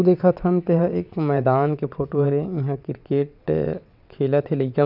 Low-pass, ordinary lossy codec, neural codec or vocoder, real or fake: 5.4 kHz; none; vocoder, 22.05 kHz, 80 mel bands, WaveNeXt; fake